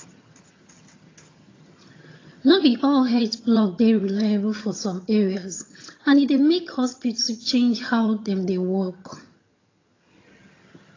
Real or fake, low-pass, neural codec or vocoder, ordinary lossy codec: fake; 7.2 kHz; vocoder, 22.05 kHz, 80 mel bands, HiFi-GAN; AAC, 32 kbps